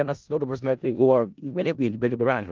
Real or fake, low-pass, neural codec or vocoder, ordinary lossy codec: fake; 7.2 kHz; codec, 16 kHz in and 24 kHz out, 0.4 kbps, LongCat-Audio-Codec, four codebook decoder; Opus, 24 kbps